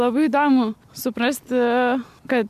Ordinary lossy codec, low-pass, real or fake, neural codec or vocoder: MP3, 96 kbps; 14.4 kHz; real; none